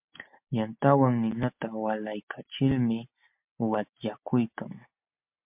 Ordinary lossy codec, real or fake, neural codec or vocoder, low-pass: MP3, 32 kbps; real; none; 3.6 kHz